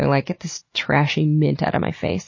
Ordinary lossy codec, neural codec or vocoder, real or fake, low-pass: MP3, 32 kbps; none; real; 7.2 kHz